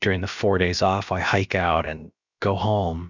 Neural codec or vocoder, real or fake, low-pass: codec, 16 kHz, about 1 kbps, DyCAST, with the encoder's durations; fake; 7.2 kHz